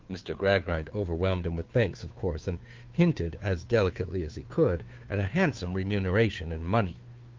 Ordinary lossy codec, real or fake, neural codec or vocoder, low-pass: Opus, 24 kbps; fake; codec, 16 kHz, 2 kbps, FunCodec, trained on Chinese and English, 25 frames a second; 7.2 kHz